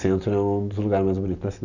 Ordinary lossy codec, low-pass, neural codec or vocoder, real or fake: none; 7.2 kHz; none; real